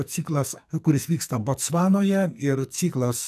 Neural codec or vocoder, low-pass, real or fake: codec, 44.1 kHz, 2.6 kbps, SNAC; 14.4 kHz; fake